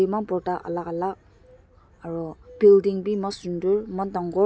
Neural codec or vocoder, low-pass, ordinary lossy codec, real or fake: none; none; none; real